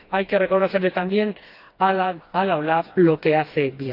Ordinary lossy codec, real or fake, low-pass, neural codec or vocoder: AAC, 32 kbps; fake; 5.4 kHz; codec, 16 kHz, 2 kbps, FreqCodec, smaller model